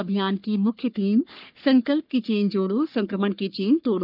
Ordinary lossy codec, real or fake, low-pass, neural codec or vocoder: none; fake; 5.4 kHz; codec, 44.1 kHz, 3.4 kbps, Pupu-Codec